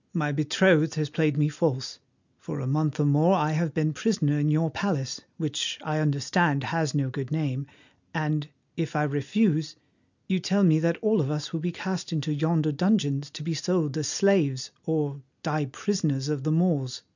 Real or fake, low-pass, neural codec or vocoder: real; 7.2 kHz; none